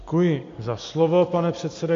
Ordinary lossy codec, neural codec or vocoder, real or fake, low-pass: AAC, 48 kbps; none; real; 7.2 kHz